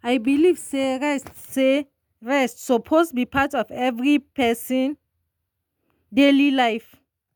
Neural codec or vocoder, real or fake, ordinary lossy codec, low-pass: none; real; none; 19.8 kHz